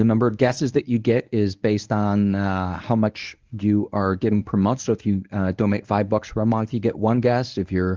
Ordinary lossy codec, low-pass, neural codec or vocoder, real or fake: Opus, 24 kbps; 7.2 kHz; codec, 24 kHz, 0.9 kbps, WavTokenizer, medium speech release version 1; fake